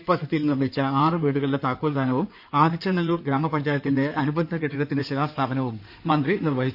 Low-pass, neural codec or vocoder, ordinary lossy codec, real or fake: 5.4 kHz; codec, 16 kHz in and 24 kHz out, 2.2 kbps, FireRedTTS-2 codec; none; fake